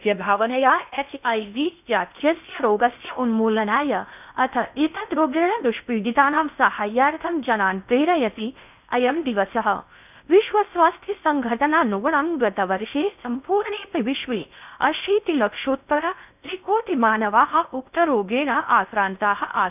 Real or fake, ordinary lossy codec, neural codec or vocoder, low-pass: fake; none; codec, 16 kHz in and 24 kHz out, 0.6 kbps, FocalCodec, streaming, 2048 codes; 3.6 kHz